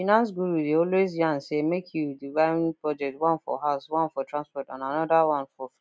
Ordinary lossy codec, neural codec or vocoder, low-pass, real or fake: none; none; none; real